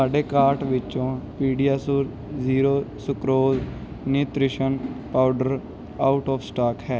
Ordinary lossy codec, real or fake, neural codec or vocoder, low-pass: none; real; none; none